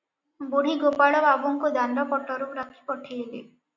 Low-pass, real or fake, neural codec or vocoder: 7.2 kHz; real; none